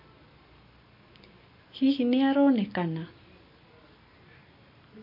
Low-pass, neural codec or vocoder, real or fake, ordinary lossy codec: 5.4 kHz; none; real; MP3, 32 kbps